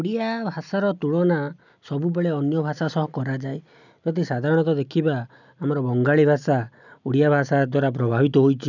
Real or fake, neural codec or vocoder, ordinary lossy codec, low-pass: real; none; none; 7.2 kHz